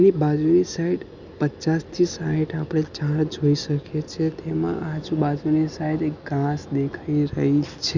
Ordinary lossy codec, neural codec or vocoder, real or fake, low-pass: none; none; real; 7.2 kHz